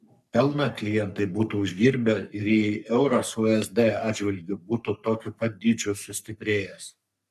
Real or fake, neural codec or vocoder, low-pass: fake; codec, 44.1 kHz, 3.4 kbps, Pupu-Codec; 14.4 kHz